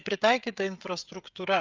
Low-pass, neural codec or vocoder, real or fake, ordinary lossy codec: 7.2 kHz; codec, 16 kHz, 16 kbps, FreqCodec, smaller model; fake; Opus, 32 kbps